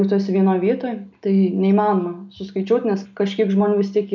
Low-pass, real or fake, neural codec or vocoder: 7.2 kHz; real; none